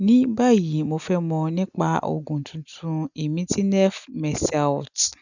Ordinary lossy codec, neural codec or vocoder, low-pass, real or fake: none; none; 7.2 kHz; real